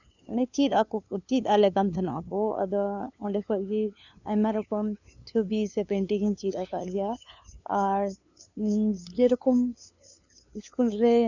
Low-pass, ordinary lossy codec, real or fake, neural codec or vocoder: 7.2 kHz; none; fake; codec, 16 kHz, 2 kbps, FunCodec, trained on LibriTTS, 25 frames a second